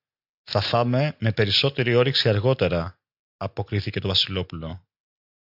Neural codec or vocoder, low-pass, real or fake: none; 5.4 kHz; real